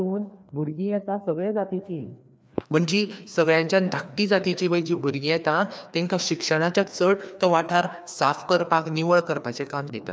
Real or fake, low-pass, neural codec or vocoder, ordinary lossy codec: fake; none; codec, 16 kHz, 2 kbps, FreqCodec, larger model; none